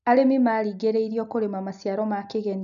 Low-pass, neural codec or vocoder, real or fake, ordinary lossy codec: 7.2 kHz; none; real; MP3, 96 kbps